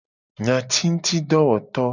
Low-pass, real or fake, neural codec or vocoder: 7.2 kHz; real; none